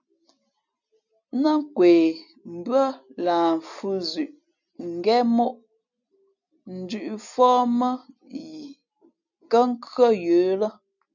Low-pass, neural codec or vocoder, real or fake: 7.2 kHz; none; real